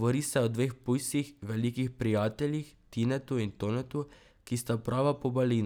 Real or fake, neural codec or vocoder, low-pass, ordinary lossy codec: real; none; none; none